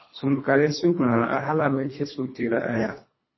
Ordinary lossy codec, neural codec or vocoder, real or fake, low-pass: MP3, 24 kbps; codec, 24 kHz, 1.5 kbps, HILCodec; fake; 7.2 kHz